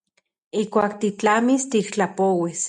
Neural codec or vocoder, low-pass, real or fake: none; 10.8 kHz; real